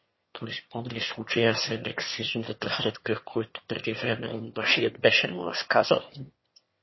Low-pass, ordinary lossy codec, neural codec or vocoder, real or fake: 7.2 kHz; MP3, 24 kbps; autoencoder, 22.05 kHz, a latent of 192 numbers a frame, VITS, trained on one speaker; fake